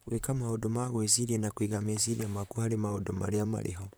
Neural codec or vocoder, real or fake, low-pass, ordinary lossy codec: vocoder, 44.1 kHz, 128 mel bands, Pupu-Vocoder; fake; none; none